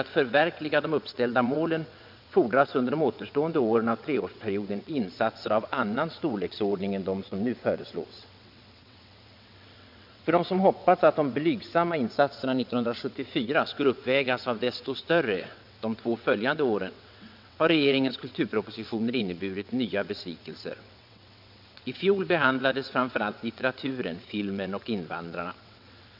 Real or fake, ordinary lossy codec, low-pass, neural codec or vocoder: fake; MP3, 48 kbps; 5.4 kHz; vocoder, 22.05 kHz, 80 mel bands, WaveNeXt